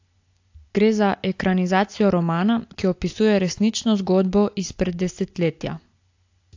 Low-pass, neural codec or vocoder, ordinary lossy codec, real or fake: 7.2 kHz; none; AAC, 48 kbps; real